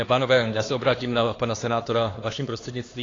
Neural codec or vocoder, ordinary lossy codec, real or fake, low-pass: codec, 16 kHz, 2 kbps, X-Codec, HuBERT features, trained on LibriSpeech; AAC, 32 kbps; fake; 7.2 kHz